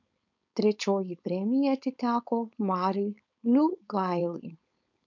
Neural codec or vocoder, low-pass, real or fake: codec, 16 kHz, 4.8 kbps, FACodec; 7.2 kHz; fake